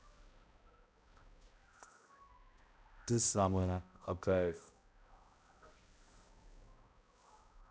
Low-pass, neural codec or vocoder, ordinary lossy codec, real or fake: none; codec, 16 kHz, 0.5 kbps, X-Codec, HuBERT features, trained on balanced general audio; none; fake